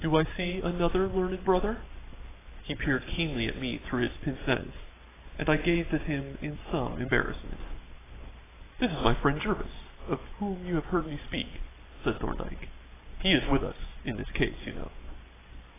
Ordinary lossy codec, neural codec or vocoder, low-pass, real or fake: AAC, 16 kbps; none; 3.6 kHz; real